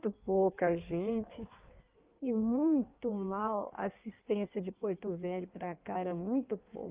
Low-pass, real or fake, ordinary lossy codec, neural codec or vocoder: 3.6 kHz; fake; Opus, 32 kbps; codec, 16 kHz in and 24 kHz out, 1.1 kbps, FireRedTTS-2 codec